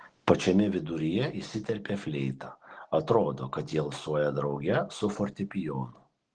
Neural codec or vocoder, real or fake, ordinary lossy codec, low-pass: none; real; Opus, 16 kbps; 9.9 kHz